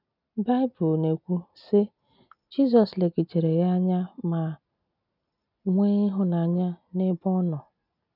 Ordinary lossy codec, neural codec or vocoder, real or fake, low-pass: none; none; real; 5.4 kHz